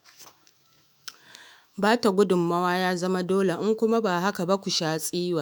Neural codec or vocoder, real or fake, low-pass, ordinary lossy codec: autoencoder, 48 kHz, 128 numbers a frame, DAC-VAE, trained on Japanese speech; fake; none; none